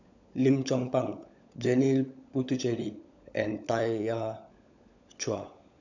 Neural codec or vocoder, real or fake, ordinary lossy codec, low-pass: codec, 16 kHz, 16 kbps, FunCodec, trained on LibriTTS, 50 frames a second; fake; none; 7.2 kHz